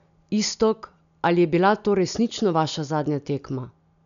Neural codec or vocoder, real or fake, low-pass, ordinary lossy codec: none; real; 7.2 kHz; none